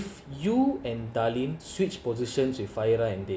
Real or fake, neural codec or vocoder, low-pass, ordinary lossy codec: real; none; none; none